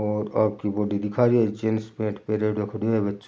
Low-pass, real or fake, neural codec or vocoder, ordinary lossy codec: none; real; none; none